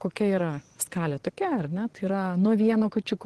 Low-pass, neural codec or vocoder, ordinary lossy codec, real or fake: 10.8 kHz; vocoder, 24 kHz, 100 mel bands, Vocos; Opus, 16 kbps; fake